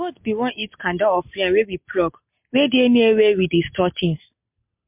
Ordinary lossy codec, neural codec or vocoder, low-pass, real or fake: MP3, 32 kbps; none; 3.6 kHz; real